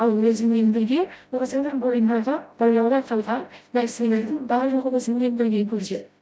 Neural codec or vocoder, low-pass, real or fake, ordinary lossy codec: codec, 16 kHz, 0.5 kbps, FreqCodec, smaller model; none; fake; none